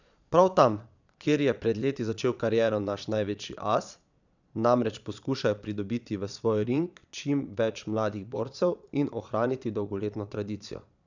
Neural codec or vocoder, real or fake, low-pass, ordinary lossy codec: vocoder, 22.05 kHz, 80 mel bands, Vocos; fake; 7.2 kHz; none